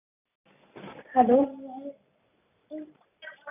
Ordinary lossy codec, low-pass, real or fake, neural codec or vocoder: none; 3.6 kHz; real; none